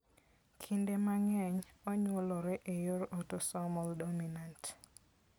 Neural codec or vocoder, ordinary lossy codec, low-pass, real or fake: none; none; none; real